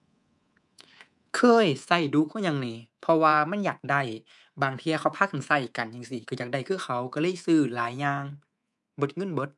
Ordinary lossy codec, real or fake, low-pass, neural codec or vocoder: none; fake; 10.8 kHz; codec, 24 kHz, 3.1 kbps, DualCodec